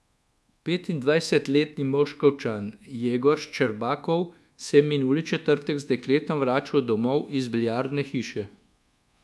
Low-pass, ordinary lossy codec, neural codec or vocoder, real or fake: none; none; codec, 24 kHz, 1.2 kbps, DualCodec; fake